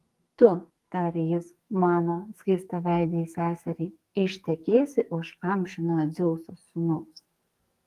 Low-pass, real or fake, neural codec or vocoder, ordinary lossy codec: 14.4 kHz; fake; codec, 44.1 kHz, 2.6 kbps, SNAC; Opus, 24 kbps